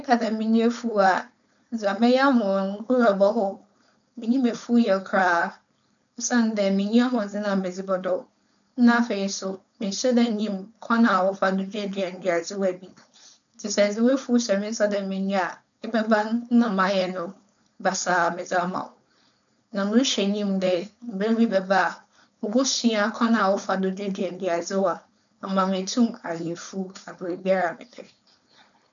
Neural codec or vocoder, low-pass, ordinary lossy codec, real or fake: codec, 16 kHz, 4.8 kbps, FACodec; 7.2 kHz; none; fake